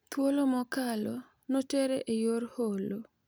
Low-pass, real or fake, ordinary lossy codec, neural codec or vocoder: none; real; none; none